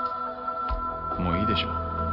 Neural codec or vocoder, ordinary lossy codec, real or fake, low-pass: none; Opus, 64 kbps; real; 5.4 kHz